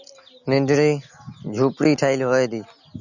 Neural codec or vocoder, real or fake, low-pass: none; real; 7.2 kHz